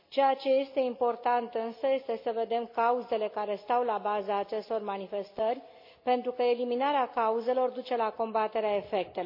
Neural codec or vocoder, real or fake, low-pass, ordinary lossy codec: none; real; 5.4 kHz; MP3, 32 kbps